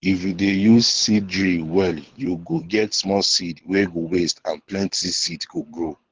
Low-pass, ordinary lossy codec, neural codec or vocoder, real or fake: 7.2 kHz; Opus, 16 kbps; codec, 24 kHz, 6 kbps, HILCodec; fake